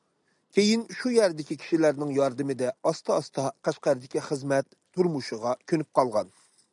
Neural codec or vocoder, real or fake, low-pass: none; real; 10.8 kHz